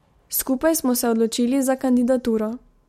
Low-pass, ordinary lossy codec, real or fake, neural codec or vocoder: 19.8 kHz; MP3, 64 kbps; real; none